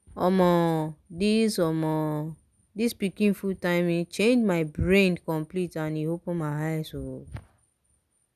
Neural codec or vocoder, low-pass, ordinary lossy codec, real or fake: none; 14.4 kHz; none; real